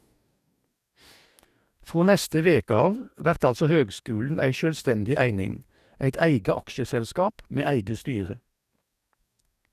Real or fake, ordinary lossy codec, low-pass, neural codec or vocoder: fake; none; 14.4 kHz; codec, 44.1 kHz, 2.6 kbps, DAC